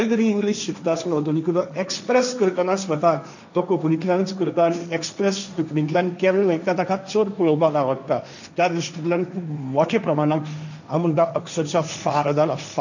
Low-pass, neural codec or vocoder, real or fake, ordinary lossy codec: 7.2 kHz; codec, 16 kHz, 1.1 kbps, Voila-Tokenizer; fake; none